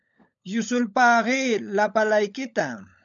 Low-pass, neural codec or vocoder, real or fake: 7.2 kHz; codec, 16 kHz, 16 kbps, FunCodec, trained on LibriTTS, 50 frames a second; fake